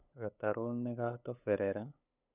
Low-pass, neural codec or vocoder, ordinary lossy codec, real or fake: 3.6 kHz; codec, 16 kHz, 8 kbps, FunCodec, trained on LibriTTS, 25 frames a second; none; fake